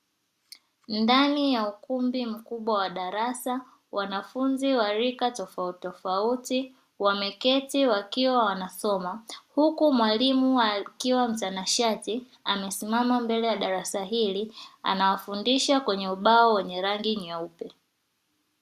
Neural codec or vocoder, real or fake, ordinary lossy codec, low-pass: none; real; Opus, 64 kbps; 14.4 kHz